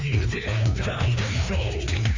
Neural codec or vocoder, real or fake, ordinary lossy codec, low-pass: codec, 16 kHz, 2 kbps, FreqCodec, larger model; fake; MP3, 48 kbps; 7.2 kHz